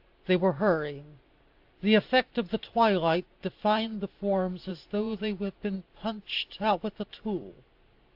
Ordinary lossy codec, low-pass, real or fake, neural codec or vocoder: AAC, 48 kbps; 5.4 kHz; fake; vocoder, 44.1 kHz, 128 mel bands every 512 samples, BigVGAN v2